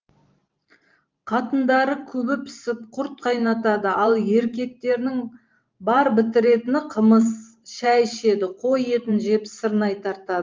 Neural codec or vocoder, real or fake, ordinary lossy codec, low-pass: vocoder, 44.1 kHz, 128 mel bands every 512 samples, BigVGAN v2; fake; Opus, 24 kbps; 7.2 kHz